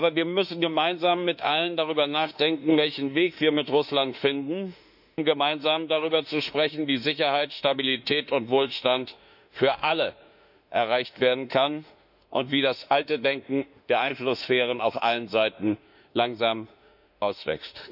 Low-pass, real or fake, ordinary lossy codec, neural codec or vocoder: 5.4 kHz; fake; none; autoencoder, 48 kHz, 32 numbers a frame, DAC-VAE, trained on Japanese speech